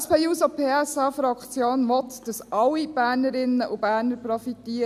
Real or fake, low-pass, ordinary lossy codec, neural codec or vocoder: real; 14.4 kHz; none; none